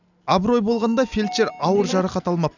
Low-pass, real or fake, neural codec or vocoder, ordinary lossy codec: 7.2 kHz; real; none; none